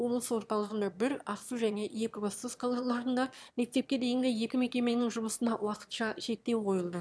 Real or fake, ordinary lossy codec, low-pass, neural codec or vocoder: fake; none; none; autoencoder, 22.05 kHz, a latent of 192 numbers a frame, VITS, trained on one speaker